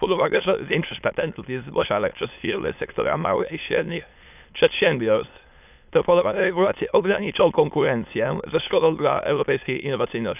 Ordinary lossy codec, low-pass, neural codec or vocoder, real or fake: none; 3.6 kHz; autoencoder, 22.05 kHz, a latent of 192 numbers a frame, VITS, trained on many speakers; fake